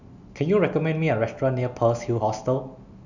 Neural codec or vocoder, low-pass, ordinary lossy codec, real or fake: none; 7.2 kHz; none; real